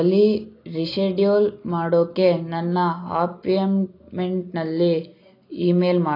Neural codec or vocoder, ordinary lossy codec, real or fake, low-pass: none; AAC, 32 kbps; real; 5.4 kHz